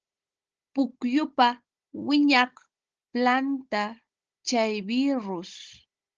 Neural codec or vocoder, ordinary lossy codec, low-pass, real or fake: codec, 16 kHz, 16 kbps, FunCodec, trained on Chinese and English, 50 frames a second; Opus, 16 kbps; 7.2 kHz; fake